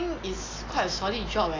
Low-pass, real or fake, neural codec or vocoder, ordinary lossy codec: 7.2 kHz; real; none; AAC, 32 kbps